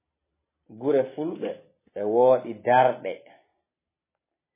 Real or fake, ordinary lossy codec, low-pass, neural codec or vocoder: real; MP3, 16 kbps; 3.6 kHz; none